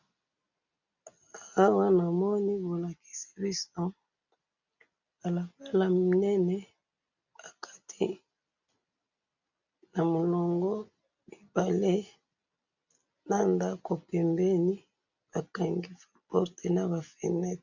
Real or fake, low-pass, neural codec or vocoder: real; 7.2 kHz; none